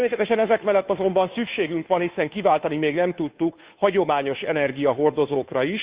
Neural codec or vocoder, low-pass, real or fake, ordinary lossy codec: codec, 16 kHz, 2 kbps, FunCodec, trained on Chinese and English, 25 frames a second; 3.6 kHz; fake; Opus, 64 kbps